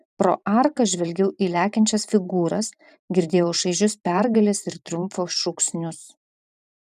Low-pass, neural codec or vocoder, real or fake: 14.4 kHz; vocoder, 44.1 kHz, 128 mel bands every 512 samples, BigVGAN v2; fake